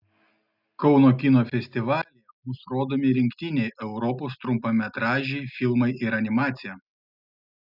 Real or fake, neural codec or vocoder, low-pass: real; none; 5.4 kHz